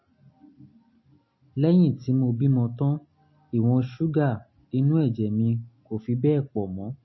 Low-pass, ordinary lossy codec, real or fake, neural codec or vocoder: 7.2 kHz; MP3, 24 kbps; real; none